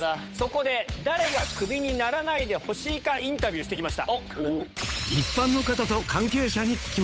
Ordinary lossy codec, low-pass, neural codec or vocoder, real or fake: none; none; codec, 16 kHz, 8 kbps, FunCodec, trained on Chinese and English, 25 frames a second; fake